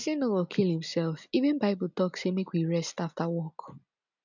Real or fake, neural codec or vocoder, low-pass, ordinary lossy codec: real; none; 7.2 kHz; none